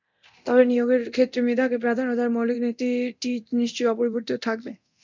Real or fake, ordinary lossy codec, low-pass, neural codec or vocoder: fake; MP3, 64 kbps; 7.2 kHz; codec, 24 kHz, 0.9 kbps, DualCodec